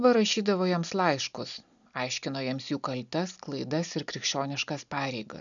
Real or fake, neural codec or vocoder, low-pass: real; none; 7.2 kHz